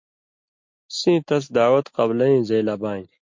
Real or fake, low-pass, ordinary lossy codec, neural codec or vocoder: real; 7.2 kHz; MP3, 48 kbps; none